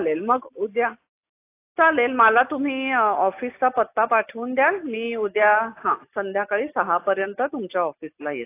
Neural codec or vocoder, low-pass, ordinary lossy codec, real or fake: none; 3.6 kHz; AAC, 24 kbps; real